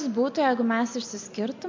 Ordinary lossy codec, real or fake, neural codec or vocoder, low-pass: MP3, 48 kbps; real; none; 7.2 kHz